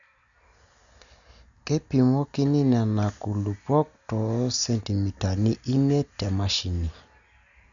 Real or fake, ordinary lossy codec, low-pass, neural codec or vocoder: real; none; 7.2 kHz; none